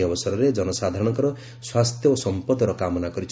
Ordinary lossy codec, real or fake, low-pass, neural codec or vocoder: none; real; none; none